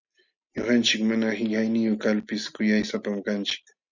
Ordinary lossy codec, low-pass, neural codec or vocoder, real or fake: Opus, 64 kbps; 7.2 kHz; none; real